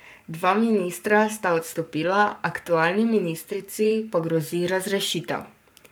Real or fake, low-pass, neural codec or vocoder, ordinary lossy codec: fake; none; codec, 44.1 kHz, 7.8 kbps, Pupu-Codec; none